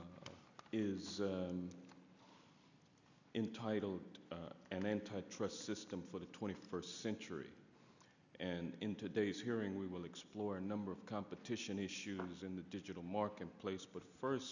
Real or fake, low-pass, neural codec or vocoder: real; 7.2 kHz; none